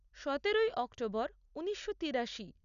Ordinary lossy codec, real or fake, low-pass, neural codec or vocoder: none; real; 7.2 kHz; none